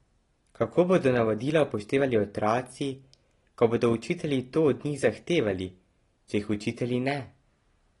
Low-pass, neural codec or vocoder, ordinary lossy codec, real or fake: 10.8 kHz; none; AAC, 32 kbps; real